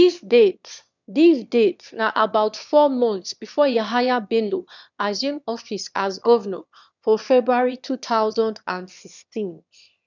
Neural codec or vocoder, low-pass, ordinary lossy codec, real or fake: autoencoder, 22.05 kHz, a latent of 192 numbers a frame, VITS, trained on one speaker; 7.2 kHz; none; fake